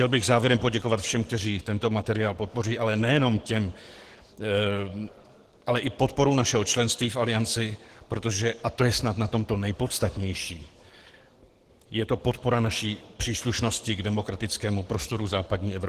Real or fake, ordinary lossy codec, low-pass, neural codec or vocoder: fake; Opus, 16 kbps; 14.4 kHz; codec, 44.1 kHz, 7.8 kbps, DAC